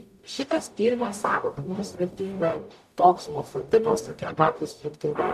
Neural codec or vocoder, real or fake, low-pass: codec, 44.1 kHz, 0.9 kbps, DAC; fake; 14.4 kHz